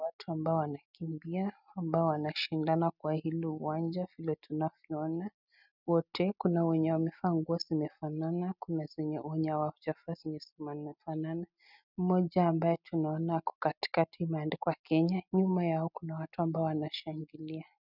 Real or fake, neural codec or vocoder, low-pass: real; none; 5.4 kHz